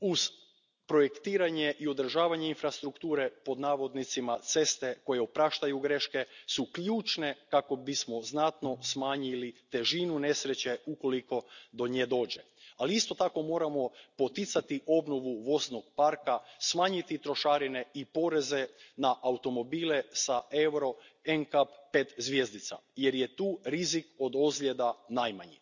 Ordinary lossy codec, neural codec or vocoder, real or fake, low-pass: none; none; real; 7.2 kHz